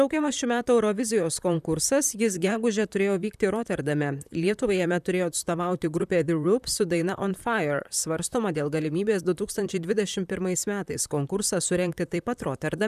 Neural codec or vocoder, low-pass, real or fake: vocoder, 44.1 kHz, 128 mel bands, Pupu-Vocoder; 14.4 kHz; fake